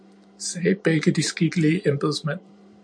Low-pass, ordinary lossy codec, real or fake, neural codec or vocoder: 9.9 kHz; MP3, 64 kbps; fake; vocoder, 44.1 kHz, 128 mel bands every 512 samples, BigVGAN v2